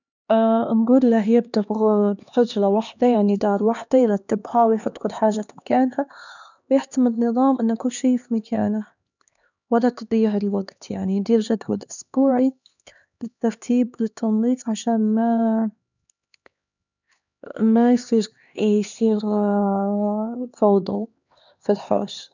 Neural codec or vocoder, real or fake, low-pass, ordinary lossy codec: codec, 16 kHz, 2 kbps, X-Codec, HuBERT features, trained on LibriSpeech; fake; 7.2 kHz; none